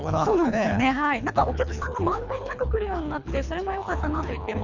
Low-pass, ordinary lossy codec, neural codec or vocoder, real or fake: 7.2 kHz; none; codec, 24 kHz, 3 kbps, HILCodec; fake